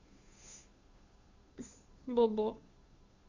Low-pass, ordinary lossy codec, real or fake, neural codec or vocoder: 7.2 kHz; Opus, 64 kbps; fake; codec, 16 kHz, 2 kbps, FunCodec, trained on Chinese and English, 25 frames a second